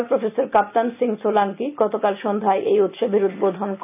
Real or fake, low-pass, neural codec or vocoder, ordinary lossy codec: real; 3.6 kHz; none; none